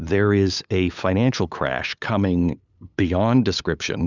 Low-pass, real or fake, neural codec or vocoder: 7.2 kHz; fake; codec, 16 kHz, 8 kbps, FunCodec, trained on LibriTTS, 25 frames a second